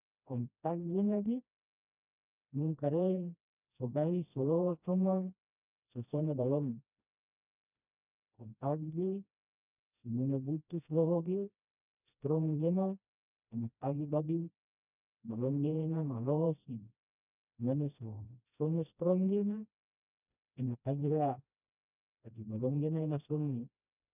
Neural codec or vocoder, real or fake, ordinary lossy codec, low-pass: codec, 16 kHz, 1 kbps, FreqCodec, smaller model; fake; none; 3.6 kHz